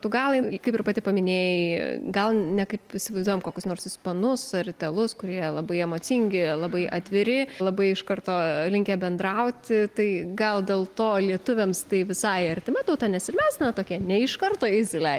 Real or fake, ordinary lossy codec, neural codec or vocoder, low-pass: real; Opus, 24 kbps; none; 14.4 kHz